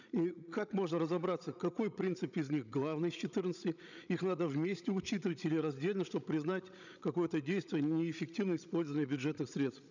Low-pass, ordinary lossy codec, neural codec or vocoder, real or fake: 7.2 kHz; none; codec, 16 kHz, 16 kbps, FreqCodec, larger model; fake